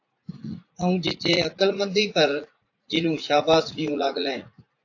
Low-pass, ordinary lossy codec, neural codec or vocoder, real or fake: 7.2 kHz; AAC, 48 kbps; vocoder, 44.1 kHz, 80 mel bands, Vocos; fake